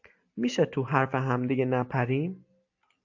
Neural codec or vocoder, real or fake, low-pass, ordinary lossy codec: none; real; 7.2 kHz; AAC, 48 kbps